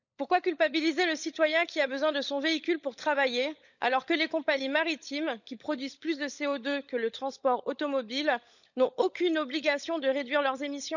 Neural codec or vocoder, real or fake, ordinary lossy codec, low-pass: codec, 16 kHz, 16 kbps, FunCodec, trained on LibriTTS, 50 frames a second; fake; none; 7.2 kHz